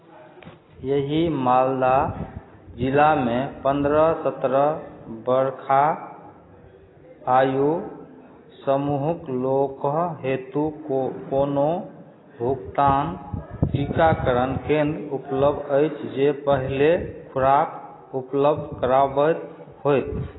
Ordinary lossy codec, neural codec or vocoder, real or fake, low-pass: AAC, 16 kbps; none; real; 7.2 kHz